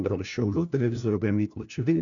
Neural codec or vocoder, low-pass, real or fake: codec, 16 kHz, 1 kbps, FunCodec, trained on LibriTTS, 50 frames a second; 7.2 kHz; fake